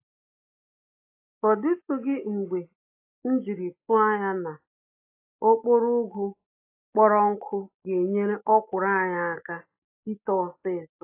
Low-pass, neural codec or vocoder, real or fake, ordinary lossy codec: 3.6 kHz; none; real; AAC, 24 kbps